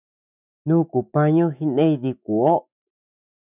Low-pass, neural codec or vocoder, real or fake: 3.6 kHz; autoencoder, 48 kHz, 128 numbers a frame, DAC-VAE, trained on Japanese speech; fake